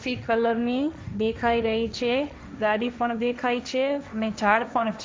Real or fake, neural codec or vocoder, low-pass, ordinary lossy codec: fake; codec, 16 kHz, 1.1 kbps, Voila-Tokenizer; none; none